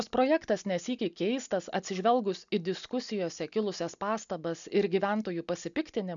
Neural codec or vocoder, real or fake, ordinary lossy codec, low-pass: none; real; MP3, 96 kbps; 7.2 kHz